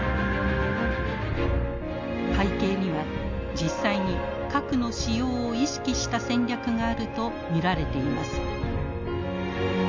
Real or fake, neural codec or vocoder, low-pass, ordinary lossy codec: real; none; 7.2 kHz; MP3, 64 kbps